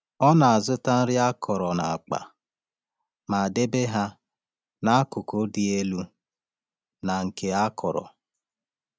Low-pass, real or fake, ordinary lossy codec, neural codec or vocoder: none; real; none; none